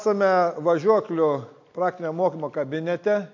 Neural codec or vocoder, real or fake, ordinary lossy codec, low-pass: none; real; MP3, 48 kbps; 7.2 kHz